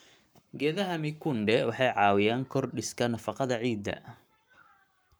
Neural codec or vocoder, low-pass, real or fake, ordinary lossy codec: codec, 44.1 kHz, 7.8 kbps, Pupu-Codec; none; fake; none